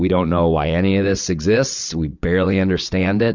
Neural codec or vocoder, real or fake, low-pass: vocoder, 22.05 kHz, 80 mel bands, WaveNeXt; fake; 7.2 kHz